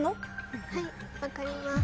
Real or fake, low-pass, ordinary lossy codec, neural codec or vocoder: real; none; none; none